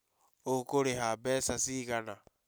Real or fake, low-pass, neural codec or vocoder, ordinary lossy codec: real; none; none; none